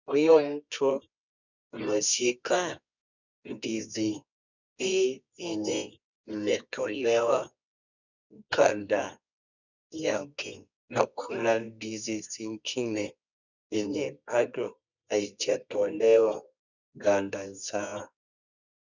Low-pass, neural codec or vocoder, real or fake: 7.2 kHz; codec, 24 kHz, 0.9 kbps, WavTokenizer, medium music audio release; fake